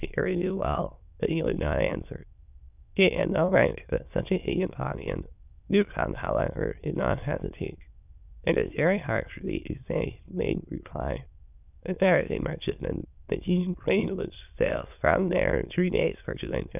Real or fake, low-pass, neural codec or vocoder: fake; 3.6 kHz; autoencoder, 22.05 kHz, a latent of 192 numbers a frame, VITS, trained on many speakers